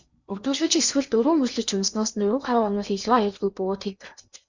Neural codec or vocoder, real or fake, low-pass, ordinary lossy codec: codec, 16 kHz in and 24 kHz out, 0.8 kbps, FocalCodec, streaming, 65536 codes; fake; 7.2 kHz; Opus, 64 kbps